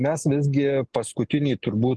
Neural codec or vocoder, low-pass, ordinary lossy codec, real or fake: none; 10.8 kHz; Opus, 16 kbps; real